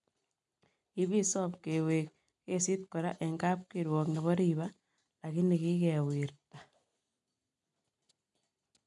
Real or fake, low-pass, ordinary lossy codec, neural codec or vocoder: real; 10.8 kHz; MP3, 96 kbps; none